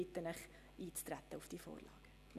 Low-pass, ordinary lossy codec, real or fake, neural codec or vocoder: 14.4 kHz; none; real; none